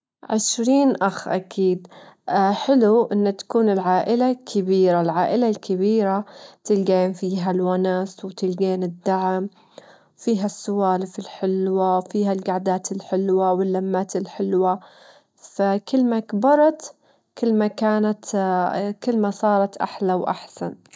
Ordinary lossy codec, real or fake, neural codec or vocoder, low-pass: none; real; none; none